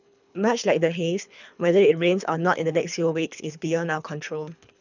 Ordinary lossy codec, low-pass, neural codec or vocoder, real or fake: none; 7.2 kHz; codec, 24 kHz, 3 kbps, HILCodec; fake